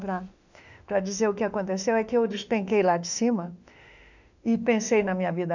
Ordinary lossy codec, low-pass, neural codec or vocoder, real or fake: none; 7.2 kHz; autoencoder, 48 kHz, 32 numbers a frame, DAC-VAE, trained on Japanese speech; fake